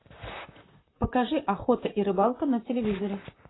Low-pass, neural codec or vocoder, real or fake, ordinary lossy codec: 7.2 kHz; vocoder, 44.1 kHz, 128 mel bands, Pupu-Vocoder; fake; AAC, 16 kbps